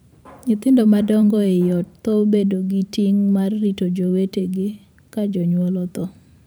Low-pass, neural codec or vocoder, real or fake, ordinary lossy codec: none; vocoder, 44.1 kHz, 128 mel bands every 512 samples, BigVGAN v2; fake; none